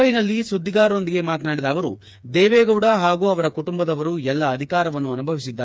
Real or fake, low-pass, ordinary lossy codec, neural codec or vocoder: fake; none; none; codec, 16 kHz, 4 kbps, FreqCodec, smaller model